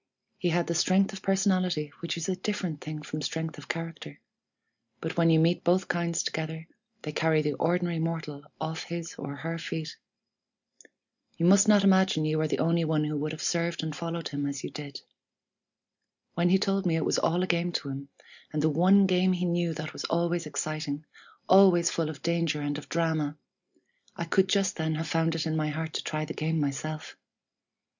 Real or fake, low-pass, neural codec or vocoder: real; 7.2 kHz; none